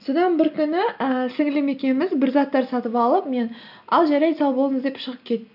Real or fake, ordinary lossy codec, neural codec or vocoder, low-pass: real; none; none; 5.4 kHz